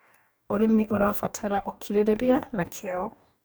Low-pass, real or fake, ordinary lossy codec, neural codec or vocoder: none; fake; none; codec, 44.1 kHz, 2.6 kbps, DAC